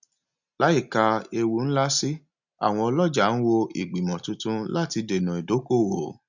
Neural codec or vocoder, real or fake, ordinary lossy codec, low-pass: none; real; none; 7.2 kHz